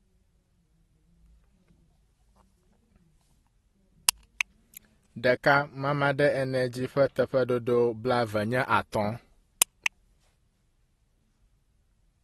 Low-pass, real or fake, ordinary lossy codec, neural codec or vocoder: 19.8 kHz; real; AAC, 32 kbps; none